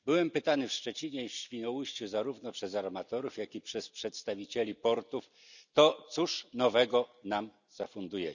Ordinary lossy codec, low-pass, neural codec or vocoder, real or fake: none; 7.2 kHz; none; real